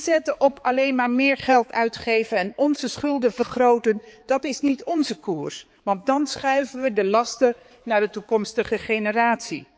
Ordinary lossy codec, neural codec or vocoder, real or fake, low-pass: none; codec, 16 kHz, 4 kbps, X-Codec, HuBERT features, trained on balanced general audio; fake; none